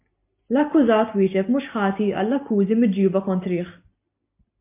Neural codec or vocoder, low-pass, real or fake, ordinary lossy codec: none; 3.6 kHz; real; MP3, 24 kbps